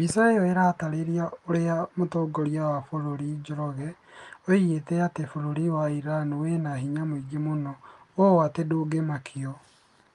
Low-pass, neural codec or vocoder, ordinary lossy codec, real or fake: 10.8 kHz; none; Opus, 32 kbps; real